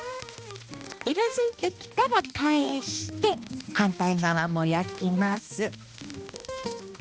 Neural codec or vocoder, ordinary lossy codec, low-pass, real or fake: codec, 16 kHz, 1 kbps, X-Codec, HuBERT features, trained on balanced general audio; none; none; fake